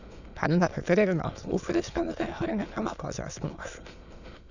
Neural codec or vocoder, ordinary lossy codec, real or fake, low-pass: autoencoder, 22.05 kHz, a latent of 192 numbers a frame, VITS, trained on many speakers; none; fake; 7.2 kHz